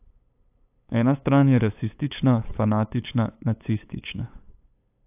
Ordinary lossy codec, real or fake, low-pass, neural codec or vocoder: AAC, 32 kbps; fake; 3.6 kHz; codec, 16 kHz, 8 kbps, FunCodec, trained on LibriTTS, 25 frames a second